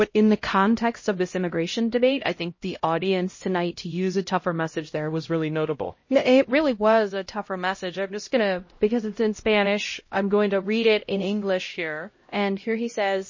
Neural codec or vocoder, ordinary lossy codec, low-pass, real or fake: codec, 16 kHz, 0.5 kbps, X-Codec, HuBERT features, trained on LibriSpeech; MP3, 32 kbps; 7.2 kHz; fake